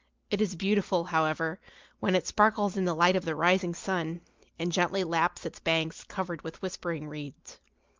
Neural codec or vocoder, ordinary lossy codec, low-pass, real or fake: none; Opus, 24 kbps; 7.2 kHz; real